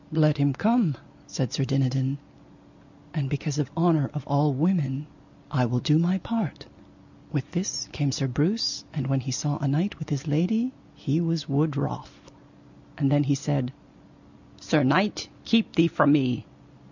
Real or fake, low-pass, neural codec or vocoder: real; 7.2 kHz; none